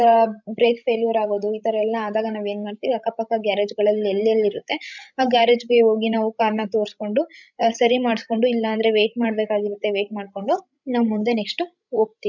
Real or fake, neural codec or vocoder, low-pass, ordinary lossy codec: fake; codec, 16 kHz, 16 kbps, FreqCodec, larger model; 7.2 kHz; none